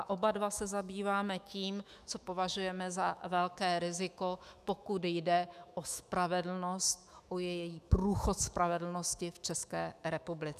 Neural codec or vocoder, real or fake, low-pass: autoencoder, 48 kHz, 128 numbers a frame, DAC-VAE, trained on Japanese speech; fake; 14.4 kHz